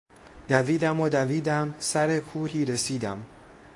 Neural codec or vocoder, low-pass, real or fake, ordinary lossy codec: codec, 24 kHz, 0.9 kbps, WavTokenizer, medium speech release version 2; 10.8 kHz; fake; AAC, 48 kbps